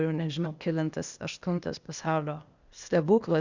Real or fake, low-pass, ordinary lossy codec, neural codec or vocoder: fake; 7.2 kHz; Opus, 64 kbps; codec, 16 kHz, 0.8 kbps, ZipCodec